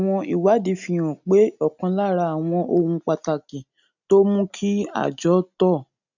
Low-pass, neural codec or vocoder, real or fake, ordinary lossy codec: 7.2 kHz; none; real; none